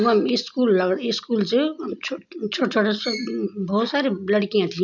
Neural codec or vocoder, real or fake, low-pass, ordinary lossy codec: none; real; 7.2 kHz; none